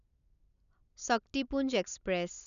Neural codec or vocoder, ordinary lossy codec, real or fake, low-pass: none; none; real; 7.2 kHz